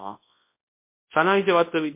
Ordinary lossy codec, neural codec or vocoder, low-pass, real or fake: MP3, 24 kbps; codec, 24 kHz, 0.9 kbps, WavTokenizer, large speech release; 3.6 kHz; fake